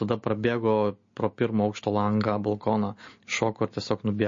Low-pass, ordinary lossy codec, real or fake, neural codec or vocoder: 7.2 kHz; MP3, 32 kbps; real; none